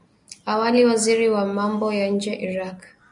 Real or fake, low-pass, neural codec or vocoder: real; 10.8 kHz; none